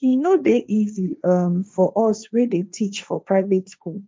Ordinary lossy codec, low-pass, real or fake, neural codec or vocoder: none; none; fake; codec, 16 kHz, 1.1 kbps, Voila-Tokenizer